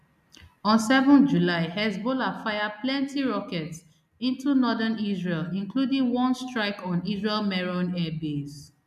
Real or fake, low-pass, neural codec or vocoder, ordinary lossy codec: real; 14.4 kHz; none; none